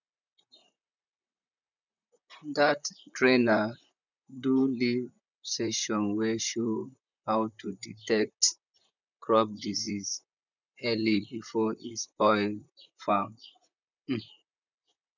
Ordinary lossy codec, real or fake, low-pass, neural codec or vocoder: none; fake; 7.2 kHz; vocoder, 44.1 kHz, 128 mel bands, Pupu-Vocoder